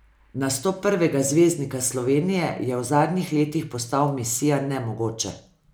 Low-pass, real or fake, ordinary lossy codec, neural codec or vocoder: none; real; none; none